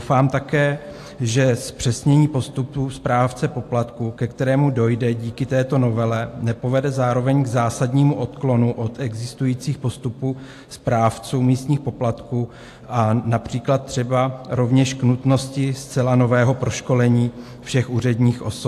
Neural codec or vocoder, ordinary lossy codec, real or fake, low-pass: none; AAC, 64 kbps; real; 14.4 kHz